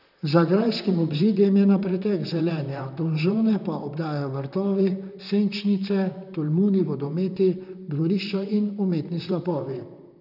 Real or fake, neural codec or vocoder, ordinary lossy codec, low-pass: fake; vocoder, 44.1 kHz, 128 mel bands, Pupu-Vocoder; none; 5.4 kHz